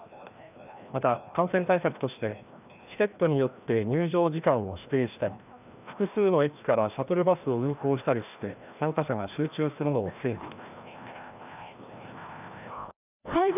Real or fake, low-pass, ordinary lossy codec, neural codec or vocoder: fake; 3.6 kHz; none; codec, 16 kHz, 1 kbps, FreqCodec, larger model